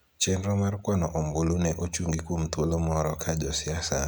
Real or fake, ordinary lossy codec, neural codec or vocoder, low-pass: real; none; none; none